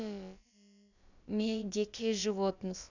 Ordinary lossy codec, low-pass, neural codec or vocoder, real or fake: none; 7.2 kHz; codec, 16 kHz, about 1 kbps, DyCAST, with the encoder's durations; fake